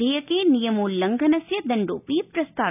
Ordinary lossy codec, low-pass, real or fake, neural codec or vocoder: none; 3.6 kHz; real; none